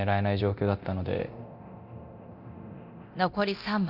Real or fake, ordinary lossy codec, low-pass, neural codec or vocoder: fake; none; 5.4 kHz; codec, 24 kHz, 0.9 kbps, DualCodec